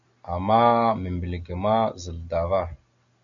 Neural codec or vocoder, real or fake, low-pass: none; real; 7.2 kHz